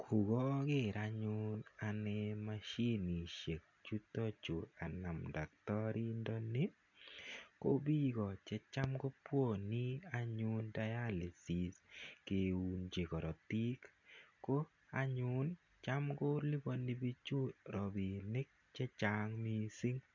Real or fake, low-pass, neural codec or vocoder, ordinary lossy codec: real; 7.2 kHz; none; none